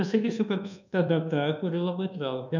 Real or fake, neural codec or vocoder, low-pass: fake; codec, 24 kHz, 1.2 kbps, DualCodec; 7.2 kHz